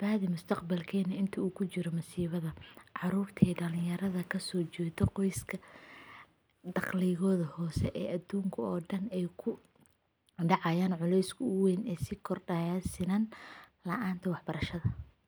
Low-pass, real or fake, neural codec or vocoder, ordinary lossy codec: none; real; none; none